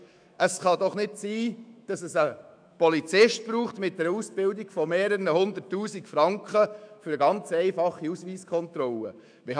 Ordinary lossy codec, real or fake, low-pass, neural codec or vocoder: none; fake; 9.9 kHz; autoencoder, 48 kHz, 128 numbers a frame, DAC-VAE, trained on Japanese speech